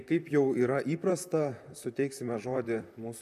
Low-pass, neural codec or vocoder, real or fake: 14.4 kHz; vocoder, 44.1 kHz, 128 mel bands, Pupu-Vocoder; fake